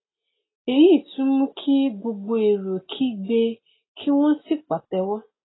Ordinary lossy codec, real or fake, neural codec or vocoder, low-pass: AAC, 16 kbps; real; none; 7.2 kHz